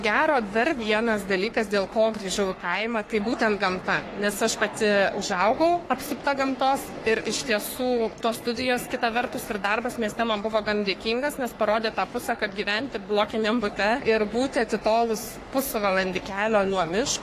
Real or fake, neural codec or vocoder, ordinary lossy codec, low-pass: fake; codec, 44.1 kHz, 3.4 kbps, Pupu-Codec; AAC, 48 kbps; 14.4 kHz